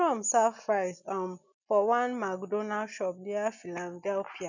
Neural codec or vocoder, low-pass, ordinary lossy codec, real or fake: none; 7.2 kHz; none; real